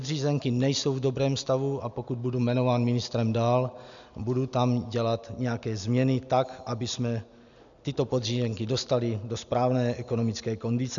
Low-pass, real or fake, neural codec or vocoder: 7.2 kHz; real; none